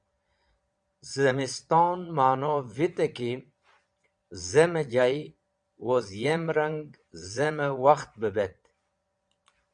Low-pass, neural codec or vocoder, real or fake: 9.9 kHz; vocoder, 22.05 kHz, 80 mel bands, Vocos; fake